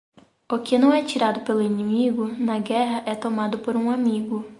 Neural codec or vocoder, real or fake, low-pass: none; real; 10.8 kHz